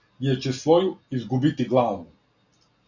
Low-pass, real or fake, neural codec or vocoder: 7.2 kHz; real; none